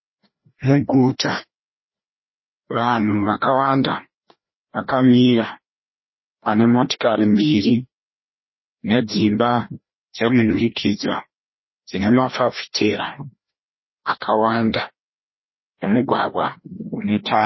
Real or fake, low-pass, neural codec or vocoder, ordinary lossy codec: fake; 7.2 kHz; codec, 16 kHz, 1 kbps, FreqCodec, larger model; MP3, 24 kbps